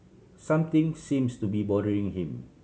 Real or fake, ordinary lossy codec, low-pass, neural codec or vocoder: real; none; none; none